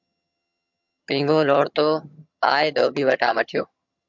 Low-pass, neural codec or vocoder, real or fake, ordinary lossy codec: 7.2 kHz; vocoder, 22.05 kHz, 80 mel bands, HiFi-GAN; fake; MP3, 64 kbps